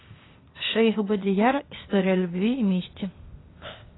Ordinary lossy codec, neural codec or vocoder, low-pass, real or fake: AAC, 16 kbps; codec, 16 kHz, 0.8 kbps, ZipCodec; 7.2 kHz; fake